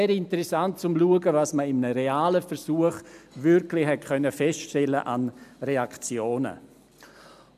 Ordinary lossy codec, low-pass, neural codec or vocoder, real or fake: none; 14.4 kHz; none; real